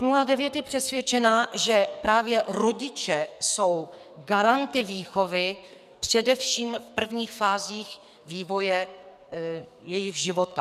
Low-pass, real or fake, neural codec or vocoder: 14.4 kHz; fake; codec, 44.1 kHz, 2.6 kbps, SNAC